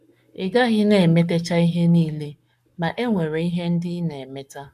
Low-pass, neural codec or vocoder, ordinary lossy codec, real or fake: 14.4 kHz; codec, 44.1 kHz, 7.8 kbps, Pupu-Codec; none; fake